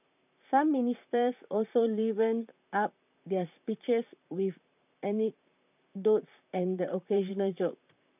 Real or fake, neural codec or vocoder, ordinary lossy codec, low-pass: fake; vocoder, 44.1 kHz, 128 mel bands, Pupu-Vocoder; none; 3.6 kHz